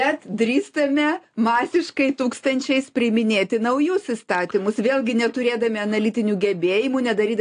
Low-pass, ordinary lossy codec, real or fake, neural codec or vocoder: 9.9 kHz; AAC, 48 kbps; real; none